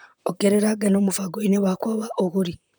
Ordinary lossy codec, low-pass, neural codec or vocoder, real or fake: none; none; vocoder, 44.1 kHz, 128 mel bands, Pupu-Vocoder; fake